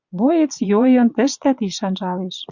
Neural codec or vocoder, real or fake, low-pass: vocoder, 22.05 kHz, 80 mel bands, Vocos; fake; 7.2 kHz